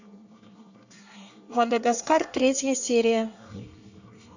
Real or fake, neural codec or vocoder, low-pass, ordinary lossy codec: fake; codec, 24 kHz, 1 kbps, SNAC; 7.2 kHz; none